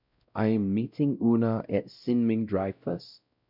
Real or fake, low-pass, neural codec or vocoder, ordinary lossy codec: fake; 5.4 kHz; codec, 16 kHz, 0.5 kbps, X-Codec, WavLM features, trained on Multilingual LibriSpeech; none